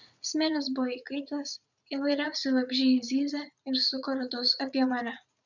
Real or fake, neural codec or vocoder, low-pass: fake; vocoder, 44.1 kHz, 80 mel bands, Vocos; 7.2 kHz